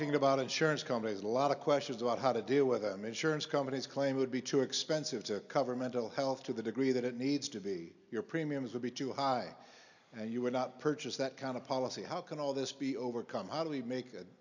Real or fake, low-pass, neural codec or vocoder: real; 7.2 kHz; none